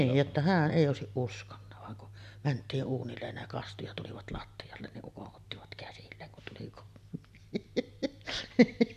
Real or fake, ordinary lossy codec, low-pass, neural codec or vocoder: real; none; 14.4 kHz; none